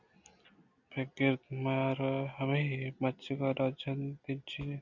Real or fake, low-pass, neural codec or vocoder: real; 7.2 kHz; none